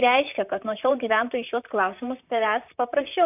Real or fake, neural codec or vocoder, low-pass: fake; vocoder, 44.1 kHz, 128 mel bands, Pupu-Vocoder; 3.6 kHz